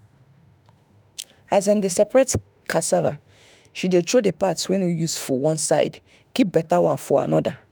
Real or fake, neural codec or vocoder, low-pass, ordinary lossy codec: fake; autoencoder, 48 kHz, 32 numbers a frame, DAC-VAE, trained on Japanese speech; none; none